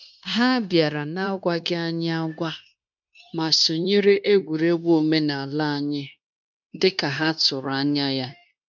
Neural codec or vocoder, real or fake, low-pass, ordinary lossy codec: codec, 16 kHz, 0.9 kbps, LongCat-Audio-Codec; fake; 7.2 kHz; none